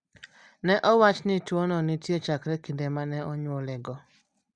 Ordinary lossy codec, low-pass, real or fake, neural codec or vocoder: Opus, 64 kbps; 9.9 kHz; real; none